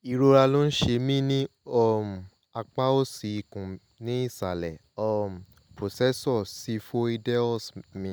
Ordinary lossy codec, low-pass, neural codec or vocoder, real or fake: none; none; none; real